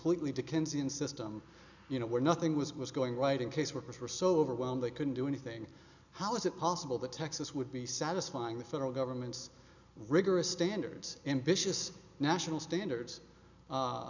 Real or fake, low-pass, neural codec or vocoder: real; 7.2 kHz; none